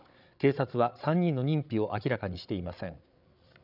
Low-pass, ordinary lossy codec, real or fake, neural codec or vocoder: 5.4 kHz; none; fake; codec, 16 kHz, 8 kbps, FreqCodec, larger model